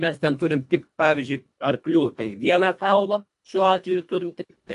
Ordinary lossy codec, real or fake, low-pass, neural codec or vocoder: AAC, 64 kbps; fake; 10.8 kHz; codec, 24 kHz, 1.5 kbps, HILCodec